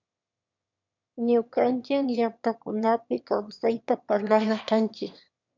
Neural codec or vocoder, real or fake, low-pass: autoencoder, 22.05 kHz, a latent of 192 numbers a frame, VITS, trained on one speaker; fake; 7.2 kHz